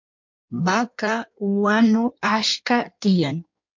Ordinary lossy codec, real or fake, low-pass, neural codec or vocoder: MP3, 48 kbps; fake; 7.2 kHz; codec, 16 kHz in and 24 kHz out, 1.1 kbps, FireRedTTS-2 codec